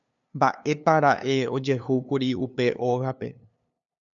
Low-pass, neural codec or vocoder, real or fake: 7.2 kHz; codec, 16 kHz, 2 kbps, FunCodec, trained on LibriTTS, 25 frames a second; fake